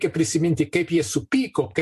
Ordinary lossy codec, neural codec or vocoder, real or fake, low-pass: MP3, 64 kbps; vocoder, 44.1 kHz, 128 mel bands every 256 samples, BigVGAN v2; fake; 14.4 kHz